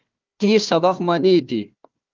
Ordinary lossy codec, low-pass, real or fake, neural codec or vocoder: Opus, 32 kbps; 7.2 kHz; fake; codec, 16 kHz, 1 kbps, FunCodec, trained on Chinese and English, 50 frames a second